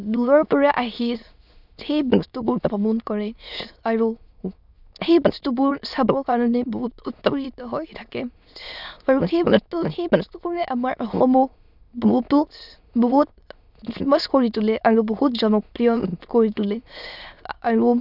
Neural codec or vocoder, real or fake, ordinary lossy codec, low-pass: autoencoder, 22.05 kHz, a latent of 192 numbers a frame, VITS, trained on many speakers; fake; none; 5.4 kHz